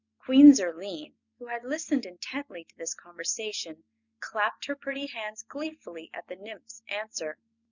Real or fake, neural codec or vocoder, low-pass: real; none; 7.2 kHz